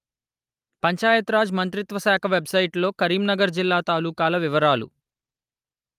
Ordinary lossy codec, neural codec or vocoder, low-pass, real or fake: Opus, 32 kbps; none; 14.4 kHz; real